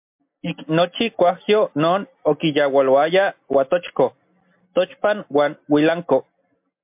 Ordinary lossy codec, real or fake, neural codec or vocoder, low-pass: MP3, 32 kbps; real; none; 3.6 kHz